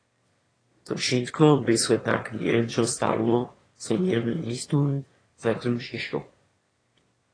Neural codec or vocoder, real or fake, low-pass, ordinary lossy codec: autoencoder, 22.05 kHz, a latent of 192 numbers a frame, VITS, trained on one speaker; fake; 9.9 kHz; AAC, 32 kbps